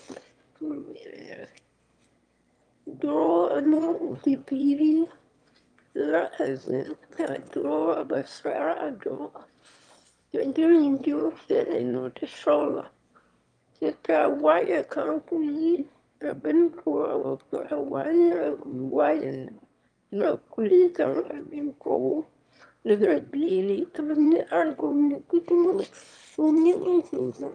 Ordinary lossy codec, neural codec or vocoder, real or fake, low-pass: Opus, 32 kbps; autoencoder, 22.05 kHz, a latent of 192 numbers a frame, VITS, trained on one speaker; fake; 9.9 kHz